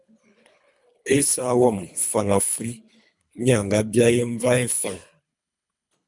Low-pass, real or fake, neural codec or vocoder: 10.8 kHz; fake; codec, 24 kHz, 3 kbps, HILCodec